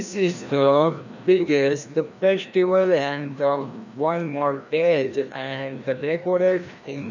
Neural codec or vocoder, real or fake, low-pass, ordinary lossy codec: codec, 16 kHz, 1 kbps, FreqCodec, larger model; fake; 7.2 kHz; none